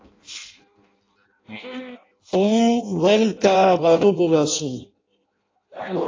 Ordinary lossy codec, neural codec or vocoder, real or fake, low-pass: AAC, 32 kbps; codec, 16 kHz in and 24 kHz out, 0.6 kbps, FireRedTTS-2 codec; fake; 7.2 kHz